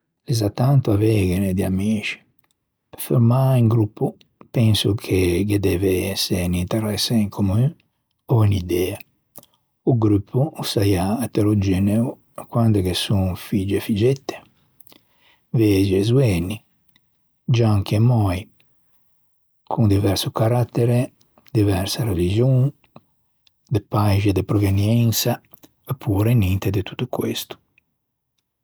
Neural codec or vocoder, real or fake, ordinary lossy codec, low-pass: none; real; none; none